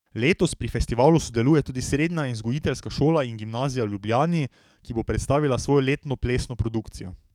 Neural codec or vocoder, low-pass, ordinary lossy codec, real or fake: codec, 44.1 kHz, 7.8 kbps, DAC; 19.8 kHz; none; fake